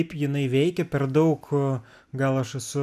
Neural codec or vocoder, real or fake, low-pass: none; real; 14.4 kHz